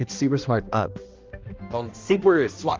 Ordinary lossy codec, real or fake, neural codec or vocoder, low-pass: Opus, 32 kbps; fake; codec, 16 kHz, 1 kbps, X-Codec, HuBERT features, trained on balanced general audio; 7.2 kHz